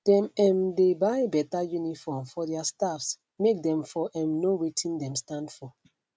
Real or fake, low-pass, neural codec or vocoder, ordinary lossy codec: real; none; none; none